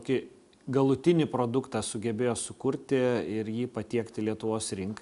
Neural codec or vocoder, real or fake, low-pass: none; real; 10.8 kHz